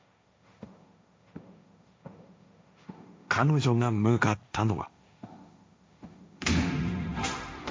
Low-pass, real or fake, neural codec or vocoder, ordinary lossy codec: none; fake; codec, 16 kHz, 1.1 kbps, Voila-Tokenizer; none